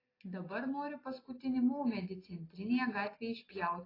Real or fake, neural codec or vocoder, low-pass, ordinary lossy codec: real; none; 5.4 kHz; AAC, 24 kbps